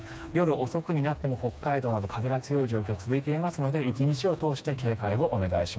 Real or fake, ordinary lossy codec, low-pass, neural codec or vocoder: fake; none; none; codec, 16 kHz, 2 kbps, FreqCodec, smaller model